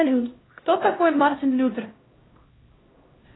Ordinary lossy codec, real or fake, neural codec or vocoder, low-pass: AAC, 16 kbps; fake; codec, 16 kHz, 0.5 kbps, X-Codec, HuBERT features, trained on LibriSpeech; 7.2 kHz